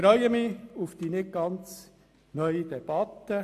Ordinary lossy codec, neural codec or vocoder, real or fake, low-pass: AAC, 64 kbps; none; real; 14.4 kHz